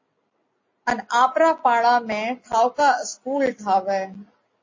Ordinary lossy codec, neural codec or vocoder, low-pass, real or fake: MP3, 32 kbps; none; 7.2 kHz; real